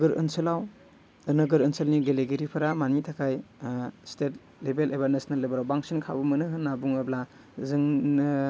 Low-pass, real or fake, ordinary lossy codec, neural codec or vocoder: none; real; none; none